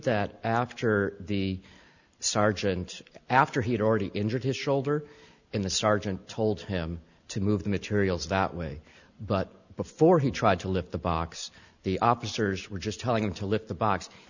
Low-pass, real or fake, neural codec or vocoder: 7.2 kHz; real; none